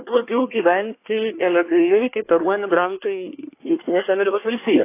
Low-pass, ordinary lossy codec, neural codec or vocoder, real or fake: 3.6 kHz; AAC, 24 kbps; codec, 24 kHz, 1 kbps, SNAC; fake